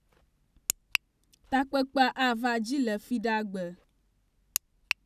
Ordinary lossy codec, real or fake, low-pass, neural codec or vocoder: none; real; 14.4 kHz; none